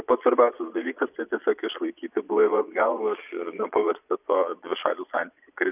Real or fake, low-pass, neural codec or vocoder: fake; 3.6 kHz; vocoder, 22.05 kHz, 80 mel bands, Vocos